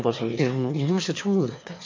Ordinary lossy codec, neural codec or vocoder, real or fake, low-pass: MP3, 48 kbps; autoencoder, 22.05 kHz, a latent of 192 numbers a frame, VITS, trained on one speaker; fake; 7.2 kHz